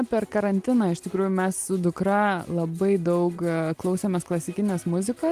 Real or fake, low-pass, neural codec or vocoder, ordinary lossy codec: real; 14.4 kHz; none; Opus, 24 kbps